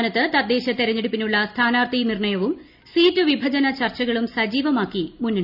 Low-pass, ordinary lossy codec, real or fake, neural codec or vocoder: 5.4 kHz; none; real; none